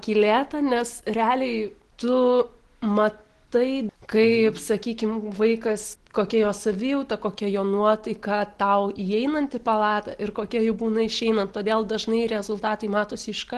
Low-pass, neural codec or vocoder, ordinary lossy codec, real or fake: 10.8 kHz; none; Opus, 16 kbps; real